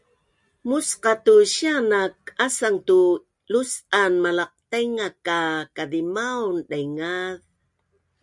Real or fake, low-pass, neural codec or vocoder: real; 10.8 kHz; none